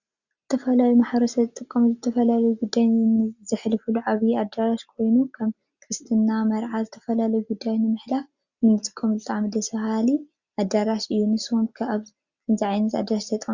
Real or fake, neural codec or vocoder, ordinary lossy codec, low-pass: real; none; Opus, 64 kbps; 7.2 kHz